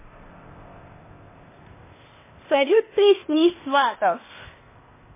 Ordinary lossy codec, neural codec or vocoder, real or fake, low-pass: MP3, 16 kbps; codec, 16 kHz, 0.8 kbps, ZipCodec; fake; 3.6 kHz